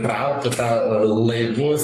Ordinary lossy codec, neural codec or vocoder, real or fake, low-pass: AAC, 64 kbps; codec, 44.1 kHz, 3.4 kbps, Pupu-Codec; fake; 14.4 kHz